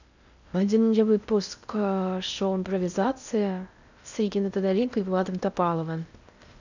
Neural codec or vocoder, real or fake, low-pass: codec, 16 kHz in and 24 kHz out, 0.6 kbps, FocalCodec, streaming, 2048 codes; fake; 7.2 kHz